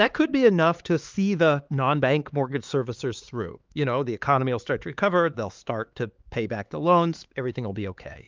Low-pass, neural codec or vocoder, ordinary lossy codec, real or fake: 7.2 kHz; codec, 16 kHz, 4 kbps, X-Codec, HuBERT features, trained on LibriSpeech; Opus, 32 kbps; fake